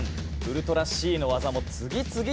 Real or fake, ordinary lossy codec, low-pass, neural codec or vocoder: real; none; none; none